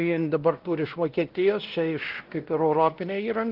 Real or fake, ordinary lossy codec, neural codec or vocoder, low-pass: fake; Opus, 16 kbps; codec, 16 kHz, 1 kbps, X-Codec, WavLM features, trained on Multilingual LibriSpeech; 5.4 kHz